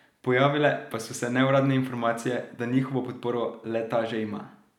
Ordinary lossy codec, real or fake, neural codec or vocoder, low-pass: none; real; none; 19.8 kHz